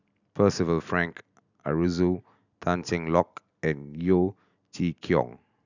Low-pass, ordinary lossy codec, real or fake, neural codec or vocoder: 7.2 kHz; none; real; none